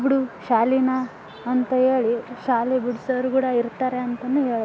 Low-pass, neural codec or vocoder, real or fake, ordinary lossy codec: none; none; real; none